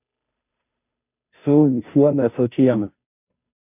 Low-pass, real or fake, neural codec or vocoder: 3.6 kHz; fake; codec, 16 kHz, 0.5 kbps, FunCodec, trained on Chinese and English, 25 frames a second